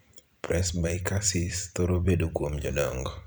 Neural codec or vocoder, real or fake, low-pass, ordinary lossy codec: vocoder, 44.1 kHz, 128 mel bands every 256 samples, BigVGAN v2; fake; none; none